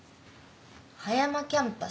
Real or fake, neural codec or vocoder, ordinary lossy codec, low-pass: real; none; none; none